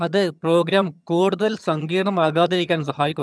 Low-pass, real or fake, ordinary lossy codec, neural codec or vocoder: none; fake; none; vocoder, 22.05 kHz, 80 mel bands, HiFi-GAN